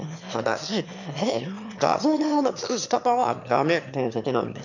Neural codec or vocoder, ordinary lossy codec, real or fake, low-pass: autoencoder, 22.05 kHz, a latent of 192 numbers a frame, VITS, trained on one speaker; none; fake; 7.2 kHz